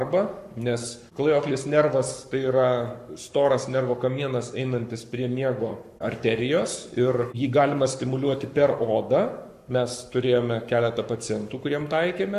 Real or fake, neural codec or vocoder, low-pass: fake; codec, 44.1 kHz, 7.8 kbps, Pupu-Codec; 14.4 kHz